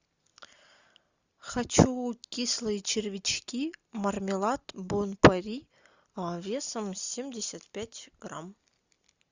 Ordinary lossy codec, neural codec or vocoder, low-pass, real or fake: Opus, 64 kbps; none; 7.2 kHz; real